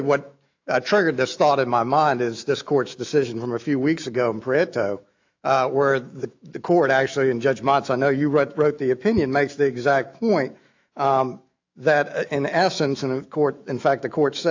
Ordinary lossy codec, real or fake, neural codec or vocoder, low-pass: AAC, 48 kbps; fake; autoencoder, 48 kHz, 128 numbers a frame, DAC-VAE, trained on Japanese speech; 7.2 kHz